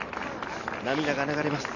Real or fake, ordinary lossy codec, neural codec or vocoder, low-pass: real; none; none; 7.2 kHz